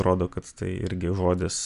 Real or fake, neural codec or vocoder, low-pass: real; none; 10.8 kHz